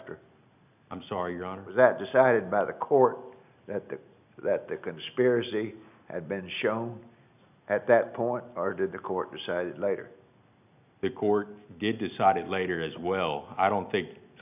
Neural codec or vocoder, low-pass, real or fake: none; 3.6 kHz; real